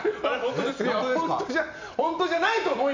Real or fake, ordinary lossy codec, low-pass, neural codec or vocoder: real; MP3, 48 kbps; 7.2 kHz; none